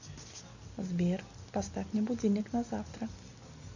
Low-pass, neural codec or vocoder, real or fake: 7.2 kHz; none; real